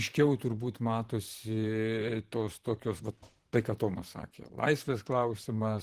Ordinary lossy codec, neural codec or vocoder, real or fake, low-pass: Opus, 16 kbps; vocoder, 44.1 kHz, 128 mel bands, Pupu-Vocoder; fake; 14.4 kHz